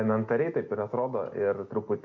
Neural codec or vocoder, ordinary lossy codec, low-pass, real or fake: none; MP3, 64 kbps; 7.2 kHz; real